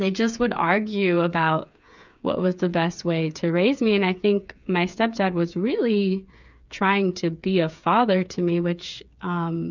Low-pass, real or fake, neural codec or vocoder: 7.2 kHz; fake; codec, 16 kHz, 8 kbps, FreqCodec, smaller model